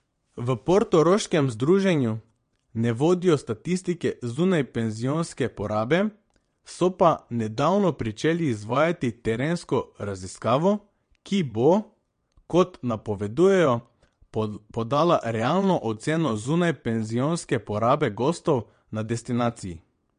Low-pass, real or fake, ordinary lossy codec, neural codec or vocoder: 9.9 kHz; fake; MP3, 48 kbps; vocoder, 22.05 kHz, 80 mel bands, WaveNeXt